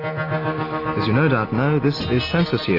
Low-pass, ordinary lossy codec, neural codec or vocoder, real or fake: 5.4 kHz; AAC, 32 kbps; none; real